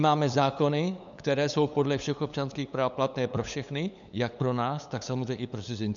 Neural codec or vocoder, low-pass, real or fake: codec, 16 kHz, 8 kbps, FunCodec, trained on LibriTTS, 25 frames a second; 7.2 kHz; fake